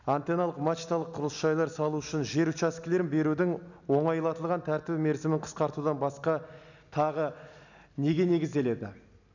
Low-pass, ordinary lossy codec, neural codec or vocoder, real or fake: 7.2 kHz; none; none; real